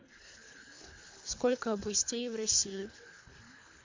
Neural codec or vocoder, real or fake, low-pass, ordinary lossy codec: codec, 24 kHz, 3 kbps, HILCodec; fake; 7.2 kHz; MP3, 48 kbps